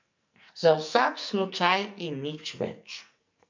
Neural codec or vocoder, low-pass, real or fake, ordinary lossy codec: codec, 44.1 kHz, 2.6 kbps, SNAC; 7.2 kHz; fake; MP3, 64 kbps